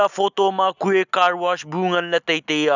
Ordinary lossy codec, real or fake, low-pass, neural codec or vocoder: none; real; 7.2 kHz; none